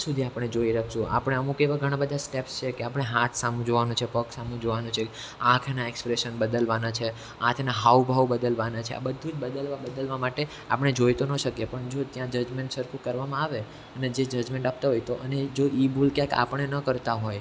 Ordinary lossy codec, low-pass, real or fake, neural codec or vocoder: none; none; real; none